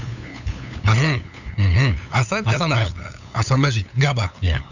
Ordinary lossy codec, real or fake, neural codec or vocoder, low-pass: none; fake; codec, 16 kHz, 8 kbps, FunCodec, trained on LibriTTS, 25 frames a second; 7.2 kHz